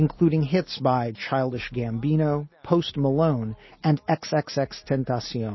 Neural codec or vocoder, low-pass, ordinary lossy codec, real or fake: none; 7.2 kHz; MP3, 24 kbps; real